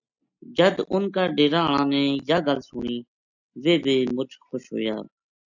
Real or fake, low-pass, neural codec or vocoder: real; 7.2 kHz; none